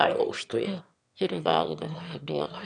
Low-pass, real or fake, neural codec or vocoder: 9.9 kHz; fake; autoencoder, 22.05 kHz, a latent of 192 numbers a frame, VITS, trained on one speaker